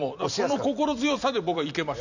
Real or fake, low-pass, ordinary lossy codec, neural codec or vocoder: fake; 7.2 kHz; none; vocoder, 44.1 kHz, 128 mel bands every 256 samples, BigVGAN v2